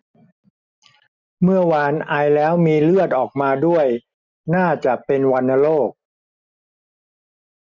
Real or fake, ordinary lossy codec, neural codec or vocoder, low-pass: real; none; none; 7.2 kHz